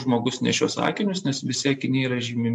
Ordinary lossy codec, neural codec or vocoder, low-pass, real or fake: MP3, 64 kbps; none; 10.8 kHz; real